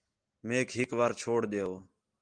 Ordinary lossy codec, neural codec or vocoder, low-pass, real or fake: Opus, 24 kbps; none; 9.9 kHz; real